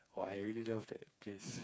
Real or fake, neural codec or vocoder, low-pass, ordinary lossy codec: fake; codec, 16 kHz, 4 kbps, FreqCodec, smaller model; none; none